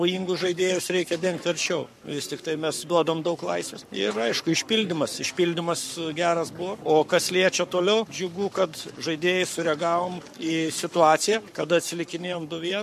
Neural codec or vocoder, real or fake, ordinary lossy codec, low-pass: codec, 44.1 kHz, 7.8 kbps, Pupu-Codec; fake; MP3, 64 kbps; 14.4 kHz